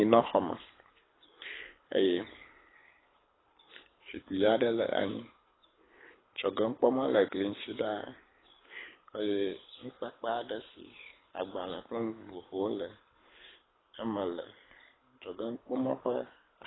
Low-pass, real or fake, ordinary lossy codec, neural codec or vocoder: 7.2 kHz; fake; AAC, 16 kbps; codec, 24 kHz, 6 kbps, HILCodec